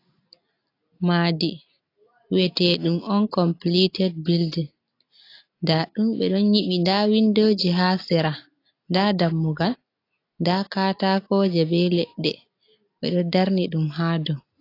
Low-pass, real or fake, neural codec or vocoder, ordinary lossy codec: 5.4 kHz; real; none; AAC, 32 kbps